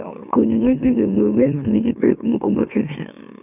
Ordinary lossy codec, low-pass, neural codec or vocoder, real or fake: none; 3.6 kHz; autoencoder, 44.1 kHz, a latent of 192 numbers a frame, MeloTTS; fake